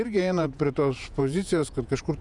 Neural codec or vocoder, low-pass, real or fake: vocoder, 48 kHz, 128 mel bands, Vocos; 10.8 kHz; fake